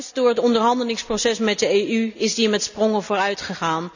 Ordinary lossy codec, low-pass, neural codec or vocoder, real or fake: none; 7.2 kHz; none; real